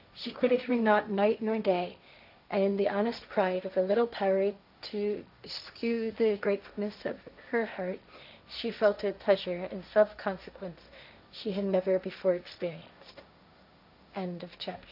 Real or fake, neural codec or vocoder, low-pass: fake; codec, 16 kHz, 1.1 kbps, Voila-Tokenizer; 5.4 kHz